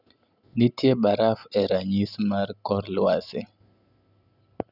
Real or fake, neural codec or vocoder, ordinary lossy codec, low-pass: real; none; none; 5.4 kHz